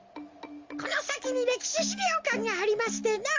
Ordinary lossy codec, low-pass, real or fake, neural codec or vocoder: Opus, 32 kbps; 7.2 kHz; real; none